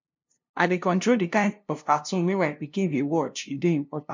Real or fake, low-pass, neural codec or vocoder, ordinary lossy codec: fake; 7.2 kHz; codec, 16 kHz, 0.5 kbps, FunCodec, trained on LibriTTS, 25 frames a second; MP3, 64 kbps